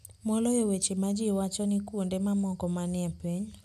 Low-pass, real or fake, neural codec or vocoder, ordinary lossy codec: 14.4 kHz; real; none; none